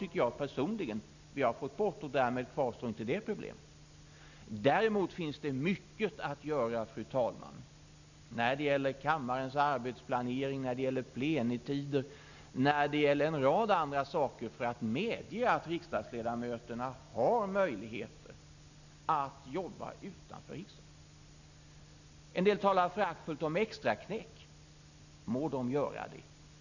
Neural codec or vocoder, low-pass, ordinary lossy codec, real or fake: none; 7.2 kHz; none; real